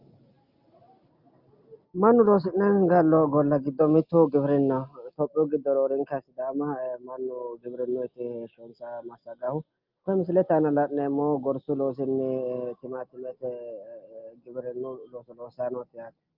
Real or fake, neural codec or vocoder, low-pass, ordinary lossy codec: real; none; 5.4 kHz; Opus, 32 kbps